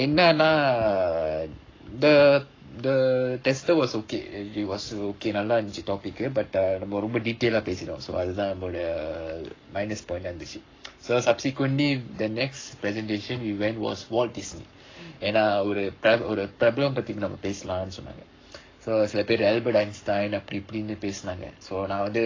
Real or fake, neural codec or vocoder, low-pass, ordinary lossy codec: fake; codec, 44.1 kHz, 7.8 kbps, Pupu-Codec; 7.2 kHz; AAC, 32 kbps